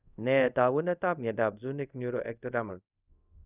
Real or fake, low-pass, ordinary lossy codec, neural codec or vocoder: fake; 3.6 kHz; none; codec, 24 kHz, 0.5 kbps, DualCodec